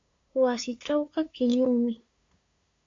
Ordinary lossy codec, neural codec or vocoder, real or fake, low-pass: AAC, 48 kbps; codec, 16 kHz, 2 kbps, FunCodec, trained on LibriTTS, 25 frames a second; fake; 7.2 kHz